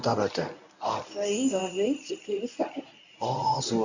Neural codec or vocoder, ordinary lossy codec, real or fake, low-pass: codec, 24 kHz, 0.9 kbps, WavTokenizer, medium speech release version 1; none; fake; 7.2 kHz